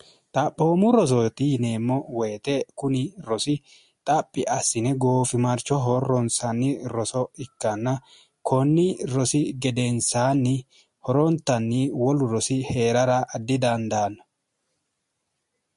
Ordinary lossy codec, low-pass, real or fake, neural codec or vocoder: MP3, 48 kbps; 14.4 kHz; real; none